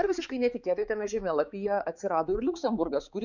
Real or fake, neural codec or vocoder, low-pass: fake; codec, 16 kHz, 4 kbps, X-Codec, HuBERT features, trained on balanced general audio; 7.2 kHz